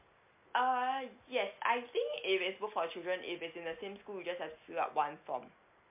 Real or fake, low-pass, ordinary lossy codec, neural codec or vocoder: real; 3.6 kHz; MP3, 32 kbps; none